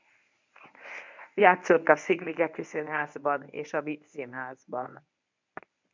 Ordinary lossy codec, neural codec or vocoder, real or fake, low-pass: MP3, 64 kbps; codec, 24 kHz, 0.9 kbps, WavTokenizer, medium speech release version 1; fake; 7.2 kHz